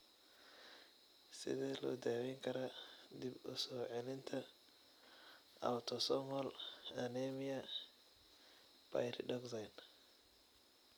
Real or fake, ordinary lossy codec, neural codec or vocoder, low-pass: real; none; none; none